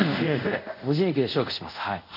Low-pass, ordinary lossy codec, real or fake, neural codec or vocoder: 5.4 kHz; none; fake; codec, 24 kHz, 0.5 kbps, DualCodec